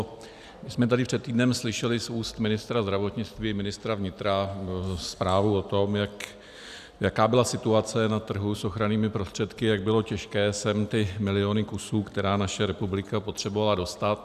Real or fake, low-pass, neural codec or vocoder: real; 14.4 kHz; none